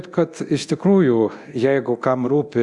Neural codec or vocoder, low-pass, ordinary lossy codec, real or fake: codec, 24 kHz, 0.9 kbps, DualCodec; 10.8 kHz; Opus, 64 kbps; fake